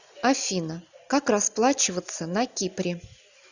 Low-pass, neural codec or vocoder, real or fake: 7.2 kHz; none; real